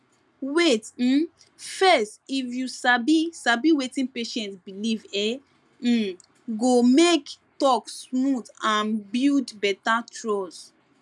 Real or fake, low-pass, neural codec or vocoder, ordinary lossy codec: real; none; none; none